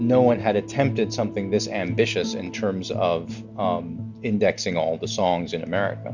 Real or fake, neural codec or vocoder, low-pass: real; none; 7.2 kHz